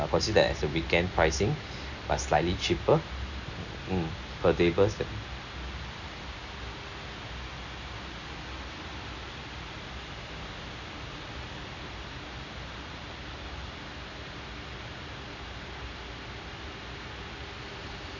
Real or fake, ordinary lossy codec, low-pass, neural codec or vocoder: real; none; 7.2 kHz; none